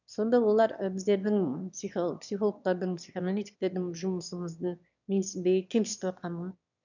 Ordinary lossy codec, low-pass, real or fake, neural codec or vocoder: none; 7.2 kHz; fake; autoencoder, 22.05 kHz, a latent of 192 numbers a frame, VITS, trained on one speaker